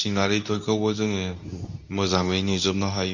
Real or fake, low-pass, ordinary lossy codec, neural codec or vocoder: fake; 7.2 kHz; none; codec, 24 kHz, 0.9 kbps, WavTokenizer, medium speech release version 2